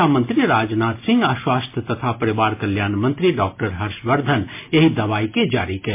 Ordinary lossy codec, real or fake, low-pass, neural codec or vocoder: MP3, 24 kbps; real; 3.6 kHz; none